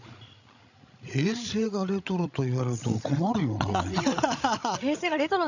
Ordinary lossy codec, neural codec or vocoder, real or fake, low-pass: none; codec, 16 kHz, 16 kbps, FreqCodec, larger model; fake; 7.2 kHz